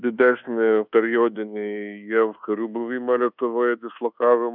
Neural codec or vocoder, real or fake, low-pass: codec, 24 kHz, 1.2 kbps, DualCodec; fake; 5.4 kHz